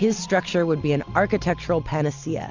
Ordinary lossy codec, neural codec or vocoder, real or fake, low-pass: Opus, 64 kbps; none; real; 7.2 kHz